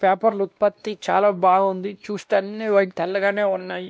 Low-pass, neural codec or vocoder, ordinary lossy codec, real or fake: none; codec, 16 kHz, 1 kbps, X-Codec, WavLM features, trained on Multilingual LibriSpeech; none; fake